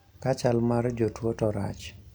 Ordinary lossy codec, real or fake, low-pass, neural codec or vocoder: none; real; none; none